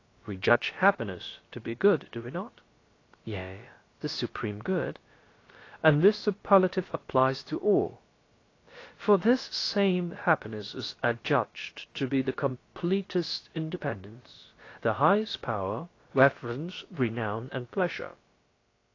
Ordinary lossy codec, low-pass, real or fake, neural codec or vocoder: AAC, 32 kbps; 7.2 kHz; fake; codec, 16 kHz, about 1 kbps, DyCAST, with the encoder's durations